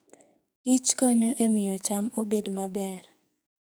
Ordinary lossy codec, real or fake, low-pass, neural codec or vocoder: none; fake; none; codec, 44.1 kHz, 2.6 kbps, SNAC